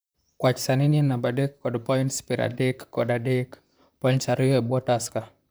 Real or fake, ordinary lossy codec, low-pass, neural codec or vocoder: fake; none; none; vocoder, 44.1 kHz, 128 mel bands, Pupu-Vocoder